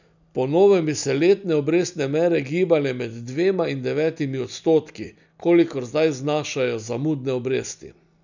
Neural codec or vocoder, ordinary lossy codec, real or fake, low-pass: none; none; real; 7.2 kHz